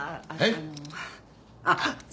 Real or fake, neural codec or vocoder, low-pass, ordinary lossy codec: real; none; none; none